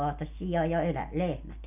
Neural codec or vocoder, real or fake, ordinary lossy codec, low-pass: none; real; none; 3.6 kHz